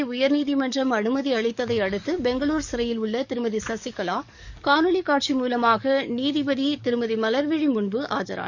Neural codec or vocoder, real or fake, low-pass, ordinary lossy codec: codec, 44.1 kHz, 7.8 kbps, DAC; fake; 7.2 kHz; none